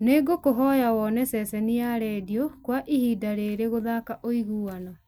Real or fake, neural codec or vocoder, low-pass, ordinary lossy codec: real; none; none; none